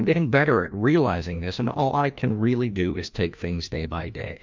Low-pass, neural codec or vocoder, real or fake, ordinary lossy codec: 7.2 kHz; codec, 16 kHz, 1 kbps, FreqCodec, larger model; fake; MP3, 64 kbps